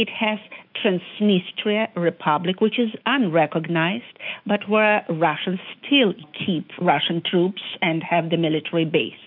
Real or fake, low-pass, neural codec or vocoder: fake; 5.4 kHz; autoencoder, 48 kHz, 128 numbers a frame, DAC-VAE, trained on Japanese speech